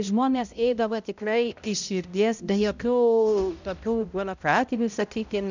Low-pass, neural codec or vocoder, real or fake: 7.2 kHz; codec, 16 kHz, 0.5 kbps, X-Codec, HuBERT features, trained on balanced general audio; fake